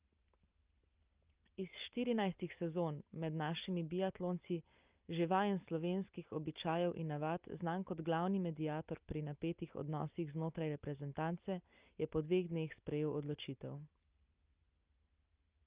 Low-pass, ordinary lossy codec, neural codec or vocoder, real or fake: 3.6 kHz; Opus, 24 kbps; none; real